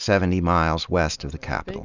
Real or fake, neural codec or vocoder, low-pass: real; none; 7.2 kHz